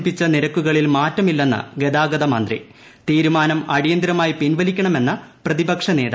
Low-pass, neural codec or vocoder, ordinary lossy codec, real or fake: none; none; none; real